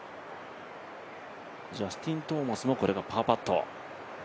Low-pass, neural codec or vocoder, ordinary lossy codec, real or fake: none; none; none; real